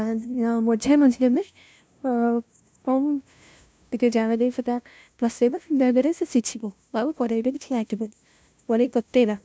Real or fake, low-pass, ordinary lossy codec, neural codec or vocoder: fake; none; none; codec, 16 kHz, 0.5 kbps, FunCodec, trained on LibriTTS, 25 frames a second